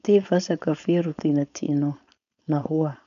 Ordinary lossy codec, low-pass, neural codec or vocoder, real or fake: none; 7.2 kHz; codec, 16 kHz, 4.8 kbps, FACodec; fake